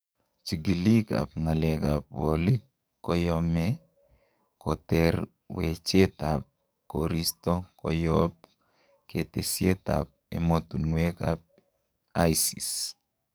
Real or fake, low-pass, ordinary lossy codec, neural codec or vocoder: fake; none; none; codec, 44.1 kHz, 7.8 kbps, DAC